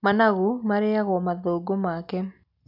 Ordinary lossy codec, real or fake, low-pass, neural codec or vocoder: none; real; 5.4 kHz; none